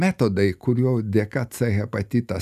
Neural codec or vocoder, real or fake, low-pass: none; real; 14.4 kHz